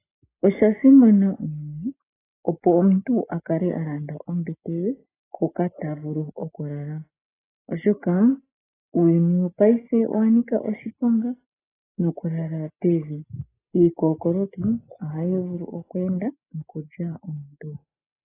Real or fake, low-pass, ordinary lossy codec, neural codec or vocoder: real; 3.6 kHz; AAC, 16 kbps; none